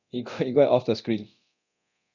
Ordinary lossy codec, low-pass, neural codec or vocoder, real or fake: none; 7.2 kHz; codec, 24 kHz, 0.9 kbps, DualCodec; fake